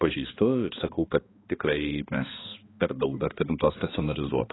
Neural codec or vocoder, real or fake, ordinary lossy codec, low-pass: codec, 16 kHz, 2 kbps, X-Codec, HuBERT features, trained on balanced general audio; fake; AAC, 16 kbps; 7.2 kHz